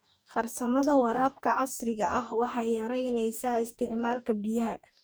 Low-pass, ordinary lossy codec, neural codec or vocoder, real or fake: none; none; codec, 44.1 kHz, 2.6 kbps, DAC; fake